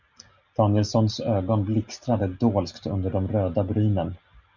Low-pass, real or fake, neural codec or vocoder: 7.2 kHz; real; none